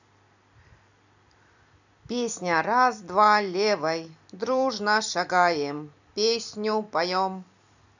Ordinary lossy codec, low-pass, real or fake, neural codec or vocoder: none; 7.2 kHz; fake; vocoder, 44.1 kHz, 128 mel bands every 256 samples, BigVGAN v2